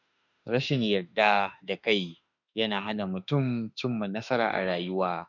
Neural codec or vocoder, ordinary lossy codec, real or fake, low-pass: autoencoder, 48 kHz, 32 numbers a frame, DAC-VAE, trained on Japanese speech; none; fake; 7.2 kHz